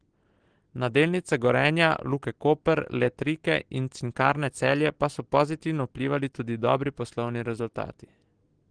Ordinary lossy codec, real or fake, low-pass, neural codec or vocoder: Opus, 16 kbps; real; 9.9 kHz; none